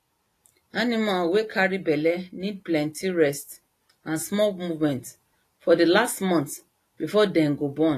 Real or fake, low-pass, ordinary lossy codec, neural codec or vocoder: real; 14.4 kHz; AAC, 48 kbps; none